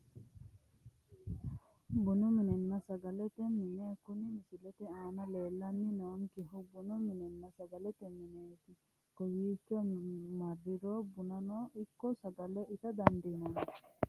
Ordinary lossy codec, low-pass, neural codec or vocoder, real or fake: Opus, 24 kbps; 14.4 kHz; none; real